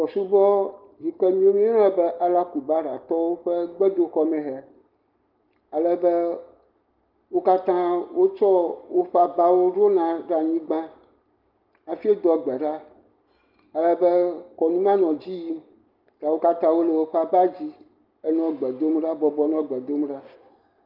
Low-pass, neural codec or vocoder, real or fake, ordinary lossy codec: 5.4 kHz; none; real; Opus, 32 kbps